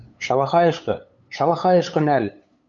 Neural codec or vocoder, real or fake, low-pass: codec, 16 kHz, 8 kbps, FunCodec, trained on LibriTTS, 25 frames a second; fake; 7.2 kHz